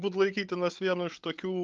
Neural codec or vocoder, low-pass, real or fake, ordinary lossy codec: codec, 16 kHz, 8 kbps, FreqCodec, larger model; 7.2 kHz; fake; Opus, 32 kbps